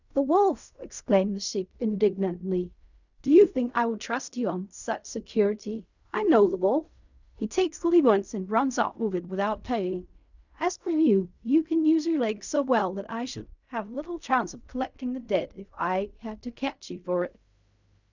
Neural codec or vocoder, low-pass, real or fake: codec, 16 kHz in and 24 kHz out, 0.4 kbps, LongCat-Audio-Codec, fine tuned four codebook decoder; 7.2 kHz; fake